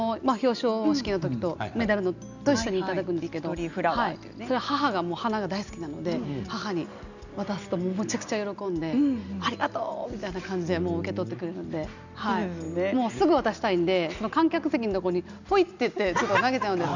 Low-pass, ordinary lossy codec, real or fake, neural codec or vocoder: 7.2 kHz; none; real; none